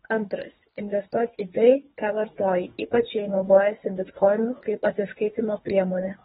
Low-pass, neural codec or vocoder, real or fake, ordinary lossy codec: 10.8 kHz; codec, 24 kHz, 3 kbps, HILCodec; fake; AAC, 16 kbps